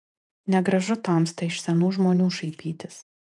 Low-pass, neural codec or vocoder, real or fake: 10.8 kHz; vocoder, 48 kHz, 128 mel bands, Vocos; fake